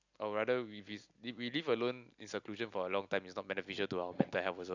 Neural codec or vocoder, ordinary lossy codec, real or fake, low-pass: none; none; real; 7.2 kHz